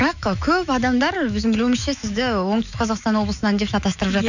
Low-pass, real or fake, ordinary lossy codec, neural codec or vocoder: 7.2 kHz; fake; none; autoencoder, 48 kHz, 128 numbers a frame, DAC-VAE, trained on Japanese speech